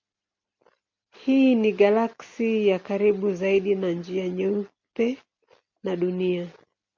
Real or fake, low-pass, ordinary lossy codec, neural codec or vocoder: real; 7.2 kHz; MP3, 48 kbps; none